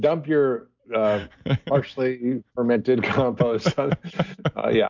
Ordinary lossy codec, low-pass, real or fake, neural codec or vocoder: MP3, 64 kbps; 7.2 kHz; real; none